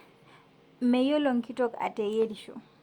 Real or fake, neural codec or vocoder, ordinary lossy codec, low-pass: real; none; Opus, 64 kbps; 19.8 kHz